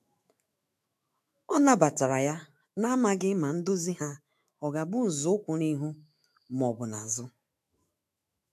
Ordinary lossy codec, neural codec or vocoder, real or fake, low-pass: MP3, 96 kbps; autoencoder, 48 kHz, 128 numbers a frame, DAC-VAE, trained on Japanese speech; fake; 14.4 kHz